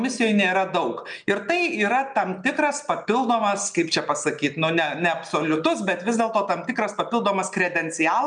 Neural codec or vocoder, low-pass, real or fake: none; 9.9 kHz; real